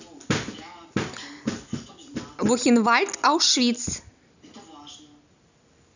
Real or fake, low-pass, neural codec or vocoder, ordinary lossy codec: real; 7.2 kHz; none; none